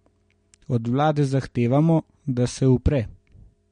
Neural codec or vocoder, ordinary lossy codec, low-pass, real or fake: none; MP3, 48 kbps; 9.9 kHz; real